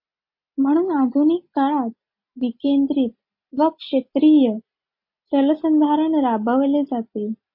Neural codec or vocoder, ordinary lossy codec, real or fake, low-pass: none; MP3, 32 kbps; real; 5.4 kHz